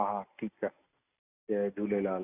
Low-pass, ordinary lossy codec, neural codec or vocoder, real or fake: 3.6 kHz; none; none; real